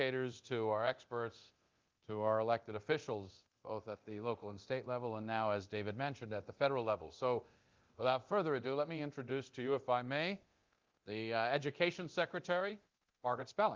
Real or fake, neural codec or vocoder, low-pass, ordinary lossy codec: fake; codec, 24 kHz, 0.9 kbps, DualCodec; 7.2 kHz; Opus, 32 kbps